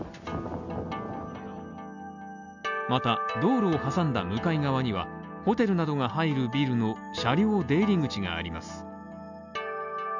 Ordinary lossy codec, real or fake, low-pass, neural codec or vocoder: none; real; 7.2 kHz; none